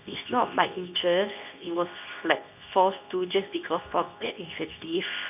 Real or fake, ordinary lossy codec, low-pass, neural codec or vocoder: fake; none; 3.6 kHz; codec, 24 kHz, 0.9 kbps, WavTokenizer, medium speech release version 2